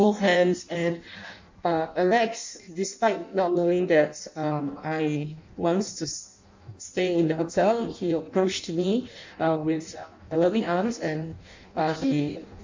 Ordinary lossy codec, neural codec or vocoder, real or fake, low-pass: MP3, 64 kbps; codec, 16 kHz in and 24 kHz out, 0.6 kbps, FireRedTTS-2 codec; fake; 7.2 kHz